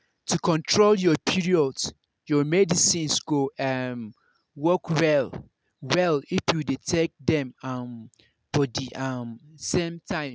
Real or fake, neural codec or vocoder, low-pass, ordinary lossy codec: real; none; none; none